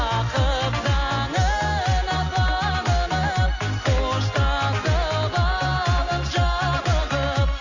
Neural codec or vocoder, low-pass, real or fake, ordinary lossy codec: none; 7.2 kHz; real; none